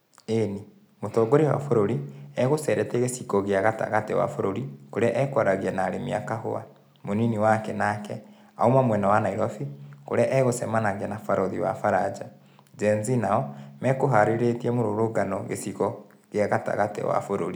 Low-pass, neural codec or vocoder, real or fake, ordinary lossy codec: none; none; real; none